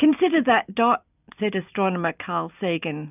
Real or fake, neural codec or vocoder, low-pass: real; none; 3.6 kHz